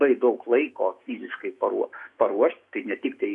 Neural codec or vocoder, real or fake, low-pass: autoencoder, 48 kHz, 128 numbers a frame, DAC-VAE, trained on Japanese speech; fake; 10.8 kHz